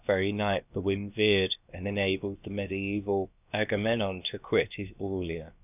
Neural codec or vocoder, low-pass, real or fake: codec, 16 kHz, about 1 kbps, DyCAST, with the encoder's durations; 3.6 kHz; fake